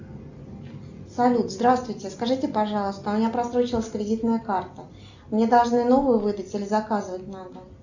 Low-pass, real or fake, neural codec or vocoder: 7.2 kHz; real; none